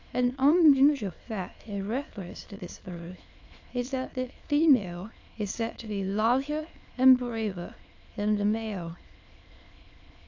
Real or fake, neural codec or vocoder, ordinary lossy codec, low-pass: fake; autoencoder, 22.05 kHz, a latent of 192 numbers a frame, VITS, trained on many speakers; AAC, 48 kbps; 7.2 kHz